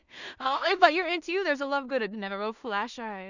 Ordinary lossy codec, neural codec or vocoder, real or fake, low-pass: none; codec, 16 kHz in and 24 kHz out, 0.4 kbps, LongCat-Audio-Codec, two codebook decoder; fake; 7.2 kHz